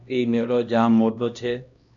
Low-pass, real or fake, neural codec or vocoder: 7.2 kHz; fake; codec, 16 kHz, 1 kbps, X-Codec, HuBERT features, trained on LibriSpeech